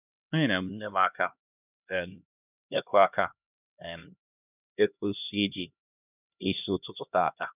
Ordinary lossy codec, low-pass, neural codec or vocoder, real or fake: none; 3.6 kHz; codec, 16 kHz, 1 kbps, X-Codec, HuBERT features, trained on LibriSpeech; fake